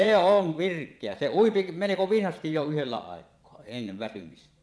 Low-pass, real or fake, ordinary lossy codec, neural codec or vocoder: none; fake; none; vocoder, 22.05 kHz, 80 mel bands, Vocos